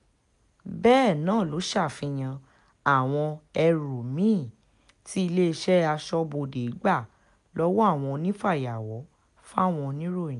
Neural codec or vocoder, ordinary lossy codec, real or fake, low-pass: none; none; real; 10.8 kHz